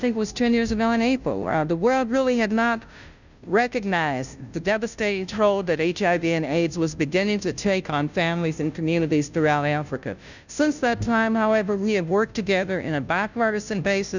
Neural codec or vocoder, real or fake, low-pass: codec, 16 kHz, 0.5 kbps, FunCodec, trained on Chinese and English, 25 frames a second; fake; 7.2 kHz